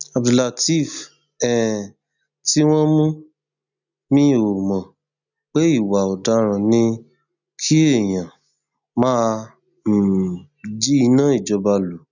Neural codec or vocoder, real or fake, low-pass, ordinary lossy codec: none; real; 7.2 kHz; none